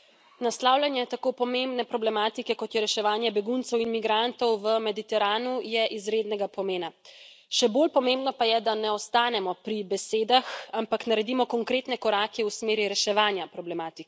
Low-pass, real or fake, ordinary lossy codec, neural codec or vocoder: none; real; none; none